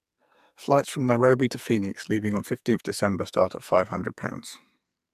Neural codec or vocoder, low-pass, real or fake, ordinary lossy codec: codec, 44.1 kHz, 2.6 kbps, SNAC; 14.4 kHz; fake; none